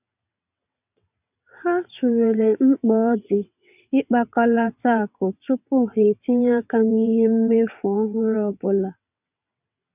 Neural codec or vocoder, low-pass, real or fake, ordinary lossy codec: vocoder, 22.05 kHz, 80 mel bands, WaveNeXt; 3.6 kHz; fake; none